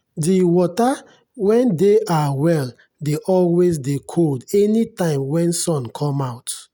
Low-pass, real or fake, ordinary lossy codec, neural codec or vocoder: none; real; none; none